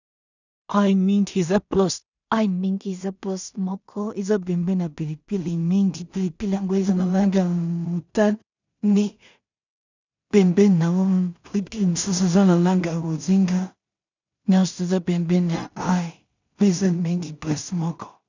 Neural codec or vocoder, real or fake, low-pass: codec, 16 kHz in and 24 kHz out, 0.4 kbps, LongCat-Audio-Codec, two codebook decoder; fake; 7.2 kHz